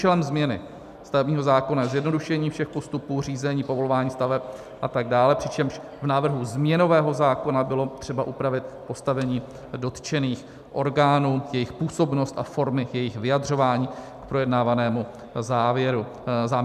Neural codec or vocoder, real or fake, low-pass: none; real; 14.4 kHz